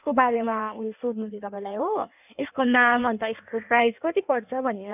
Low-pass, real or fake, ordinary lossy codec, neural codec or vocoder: 3.6 kHz; fake; none; codec, 16 kHz in and 24 kHz out, 1.1 kbps, FireRedTTS-2 codec